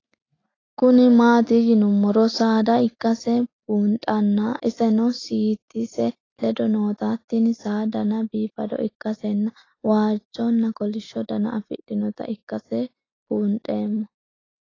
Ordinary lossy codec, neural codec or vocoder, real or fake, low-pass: AAC, 32 kbps; none; real; 7.2 kHz